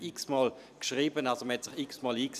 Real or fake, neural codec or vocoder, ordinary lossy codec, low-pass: fake; vocoder, 44.1 kHz, 128 mel bands every 256 samples, BigVGAN v2; none; 14.4 kHz